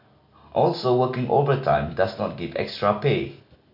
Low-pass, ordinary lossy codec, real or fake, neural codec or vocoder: 5.4 kHz; none; real; none